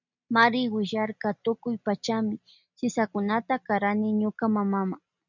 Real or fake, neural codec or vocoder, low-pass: real; none; 7.2 kHz